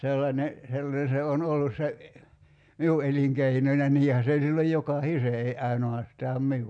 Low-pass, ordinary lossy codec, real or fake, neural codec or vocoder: 9.9 kHz; none; real; none